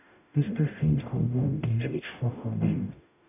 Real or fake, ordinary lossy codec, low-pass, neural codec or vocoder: fake; none; 3.6 kHz; codec, 44.1 kHz, 0.9 kbps, DAC